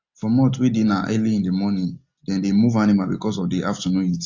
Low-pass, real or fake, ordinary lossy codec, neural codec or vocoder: 7.2 kHz; real; AAC, 48 kbps; none